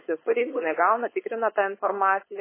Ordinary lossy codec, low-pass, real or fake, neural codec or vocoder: MP3, 16 kbps; 3.6 kHz; fake; codec, 16 kHz, 4.8 kbps, FACodec